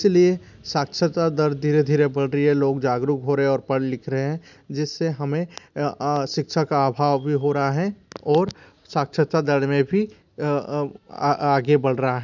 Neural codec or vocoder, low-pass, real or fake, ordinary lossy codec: none; 7.2 kHz; real; none